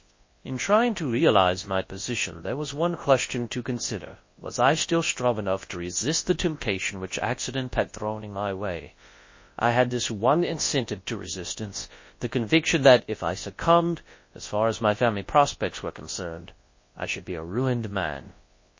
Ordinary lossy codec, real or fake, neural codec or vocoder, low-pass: MP3, 32 kbps; fake; codec, 24 kHz, 0.9 kbps, WavTokenizer, large speech release; 7.2 kHz